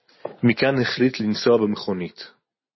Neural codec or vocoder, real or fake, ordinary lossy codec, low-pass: none; real; MP3, 24 kbps; 7.2 kHz